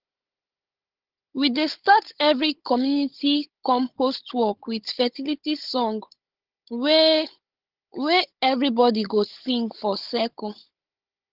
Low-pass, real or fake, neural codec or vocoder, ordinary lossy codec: 5.4 kHz; fake; codec, 16 kHz, 16 kbps, FunCodec, trained on Chinese and English, 50 frames a second; Opus, 16 kbps